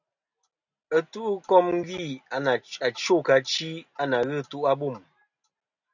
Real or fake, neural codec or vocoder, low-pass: real; none; 7.2 kHz